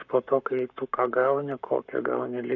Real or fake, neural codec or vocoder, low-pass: fake; codec, 44.1 kHz, 7.8 kbps, Pupu-Codec; 7.2 kHz